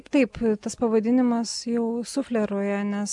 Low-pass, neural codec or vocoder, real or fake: 10.8 kHz; none; real